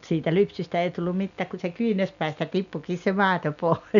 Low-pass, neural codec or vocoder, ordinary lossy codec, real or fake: 7.2 kHz; none; none; real